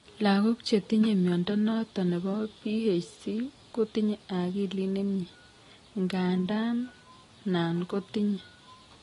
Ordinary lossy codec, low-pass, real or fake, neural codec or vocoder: AAC, 32 kbps; 10.8 kHz; real; none